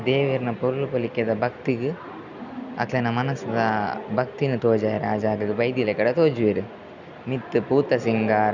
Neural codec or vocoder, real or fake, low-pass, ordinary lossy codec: none; real; 7.2 kHz; none